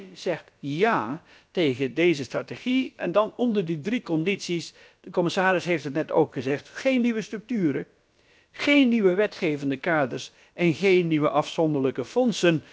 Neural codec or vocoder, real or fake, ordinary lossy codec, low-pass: codec, 16 kHz, about 1 kbps, DyCAST, with the encoder's durations; fake; none; none